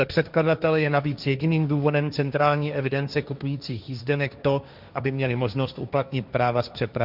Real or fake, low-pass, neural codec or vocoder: fake; 5.4 kHz; codec, 16 kHz, 1.1 kbps, Voila-Tokenizer